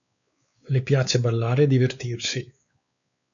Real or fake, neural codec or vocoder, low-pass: fake; codec, 16 kHz, 4 kbps, X-Codec, WavLM features, trained on Multilingual LibriSpeech; 7.2 kHz